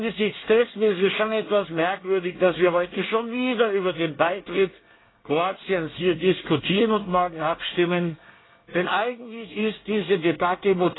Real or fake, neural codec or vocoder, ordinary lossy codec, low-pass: fake; codec, 24 kHz, 1 kbps, SNAC; AAC, 16 kbps; 7.2 kHz